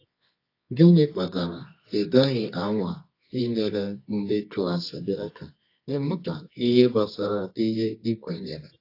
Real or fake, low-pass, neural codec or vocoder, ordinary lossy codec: fake; 5.4 kHz; codec, 24 kHz, 0.9 kbps, WavTokenizer, medium music audio release; AAC, 32 kbps